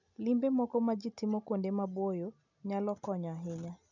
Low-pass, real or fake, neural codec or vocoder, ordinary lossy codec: 7.2 kHz; real; none; none